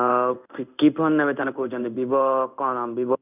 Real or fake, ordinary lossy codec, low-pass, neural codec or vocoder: fake; none; 3.6 kHz; codec, 16 kHz in and 24 kHz out, 1 kbps, XY-Tokenizer